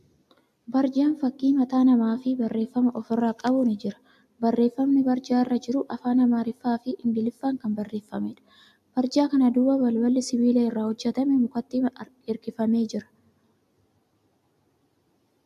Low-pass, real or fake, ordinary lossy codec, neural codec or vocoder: 14.4 kHz; real; AAC, 96 kbps; none